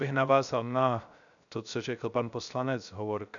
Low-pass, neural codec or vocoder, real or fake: 7.2 kHz; codec, 16 kHz, 0.3 kbps, FocalCodec; fake